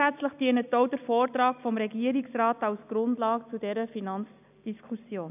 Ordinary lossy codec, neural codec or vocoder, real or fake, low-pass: none; none; real; 3.6 kHz